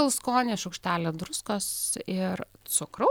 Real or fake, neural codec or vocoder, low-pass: real; none; 19.8 kHz